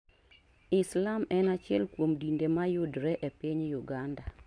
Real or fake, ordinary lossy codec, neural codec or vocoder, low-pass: real; MP3, 64 kbps; none; 9.9 kHz